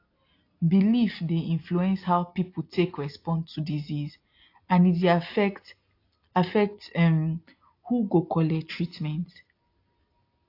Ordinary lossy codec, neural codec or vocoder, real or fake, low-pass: AAC, 32 kbps; none; real; 5.4 kHz